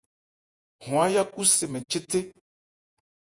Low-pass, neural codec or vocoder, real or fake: 10.8 kHz; vocoder, 48 kHz, 128 mel bands, Vocos; fake